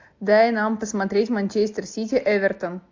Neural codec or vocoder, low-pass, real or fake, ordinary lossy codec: none; 7.2 kHz; real; AAC, 48 kbps